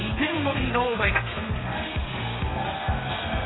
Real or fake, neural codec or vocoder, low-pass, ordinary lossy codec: fake; codec, 32 kHz, 1.9 kbps, SNAC; 7.2 kHz; AAC, 16 kbps